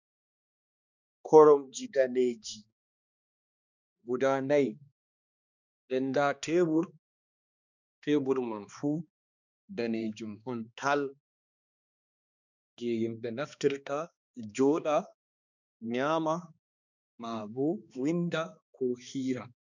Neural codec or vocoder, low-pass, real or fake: codec, 16 kHz, 2 kbps, X-Codec, HuBERT features, trained on balanced general audio; 7.2 kHz; fake